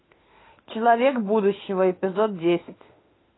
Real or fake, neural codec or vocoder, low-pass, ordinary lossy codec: fake; autoencoder, 48 kHz, 32 numbers a frame, DAC-VAE, trained on Japanese speech; 7.2 kHz; AAC, 16 kbps